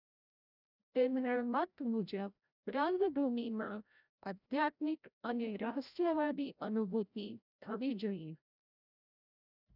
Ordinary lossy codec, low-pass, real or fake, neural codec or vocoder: none; 5.4 kHz; fake; codec, 16 kHz, 0.5 kbps, FreqCodec, larger model